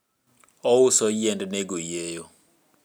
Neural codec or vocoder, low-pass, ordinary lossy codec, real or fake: none; none; none; real